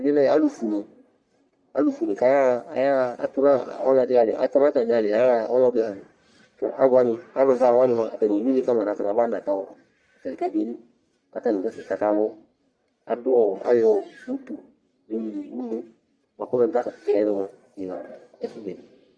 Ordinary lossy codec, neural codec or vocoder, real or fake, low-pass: Opus, 64 kbps; codec, 44.1 kHz, 1.7 kbps, Pupu-Codec; fake; 9.9 kHz